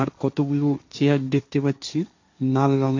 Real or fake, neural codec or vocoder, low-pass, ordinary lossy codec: fake; codec, 16 kHz, 1.1 kbps, Voila-Tokenizer; 7.2 kHz; AAC, 48 kbps